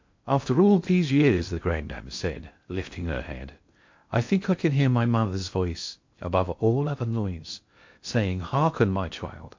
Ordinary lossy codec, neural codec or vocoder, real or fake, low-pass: MP3, 48 kbps; codec, 16 kHz in and 24 kHz out, 0.6 kbps, FocalCodec, streaming, 2048 codes; fake; 7.2 kHz